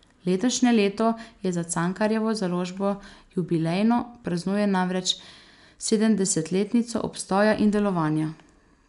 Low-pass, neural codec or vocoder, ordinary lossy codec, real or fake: 10.8 kHz; none; none; real